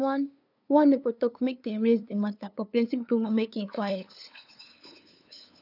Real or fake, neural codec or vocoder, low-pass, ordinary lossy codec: fake; codec, 16 kHz, 2 kbps, FunCodec, trained on LibriTTS, 25 frames a second; 5.4 kHz; none